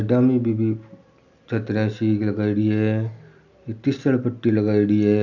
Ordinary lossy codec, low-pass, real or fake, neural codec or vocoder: none; 7.2 kHz; real; none